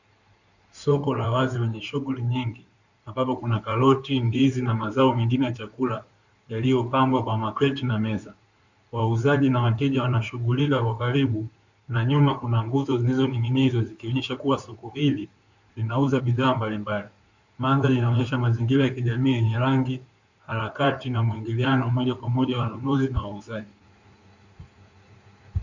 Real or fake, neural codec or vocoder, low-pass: fake; codec, 16 kHz in and 24 kHz out, 2.2 kbps, FireRedTTS-2 codec; 7.2 kHz